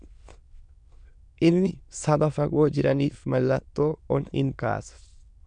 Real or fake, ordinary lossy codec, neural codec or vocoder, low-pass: fake; MP3, 96 kbps; autoencoder, 22.05 kHz, a latent of 192 numbers a frame, VITS, trained on many speakers; 9.9 kHz